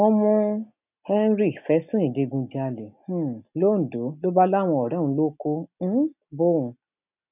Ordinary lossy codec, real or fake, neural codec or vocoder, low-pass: none; real; none; 3.6 kHz